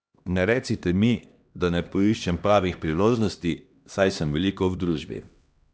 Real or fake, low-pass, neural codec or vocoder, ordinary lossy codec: fake; none; codec, 16 kHz, 1 kbps, X-Codec, HuBERT features, trained on LibriSpeech; none